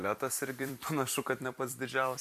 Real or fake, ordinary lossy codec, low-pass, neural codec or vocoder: fake; MP3, 96 kbps; 14.4 kHz; vocoder, 44.1 kHz, 128 mel bands every 256 samples, BigVGAN v2